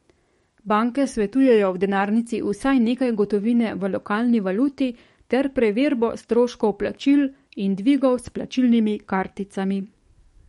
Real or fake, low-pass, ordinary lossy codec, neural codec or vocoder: fake; 19.8 kHz; MP3, 48 kbps; codec, 44.1 kHz, 7.8 kbps, DAC